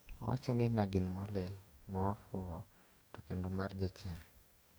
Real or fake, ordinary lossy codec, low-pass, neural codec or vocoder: fake; none; none; codec, 44.1 kHz, 2.6 kbps, DAC